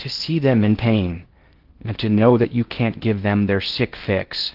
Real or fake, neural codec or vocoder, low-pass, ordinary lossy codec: fake; codec, 16 kHz in and 24 kHz out, 0.6 kbps, FocalCodec, streaming, 2048 codes; 5.4 kHz; Opus, 16 kbps